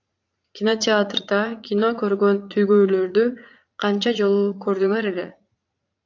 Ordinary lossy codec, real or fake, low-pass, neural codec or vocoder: AAC, 48 kbps; fake; 7.2 kHz; vocoder, 44.1 kHz, 80 mel bands, Vocos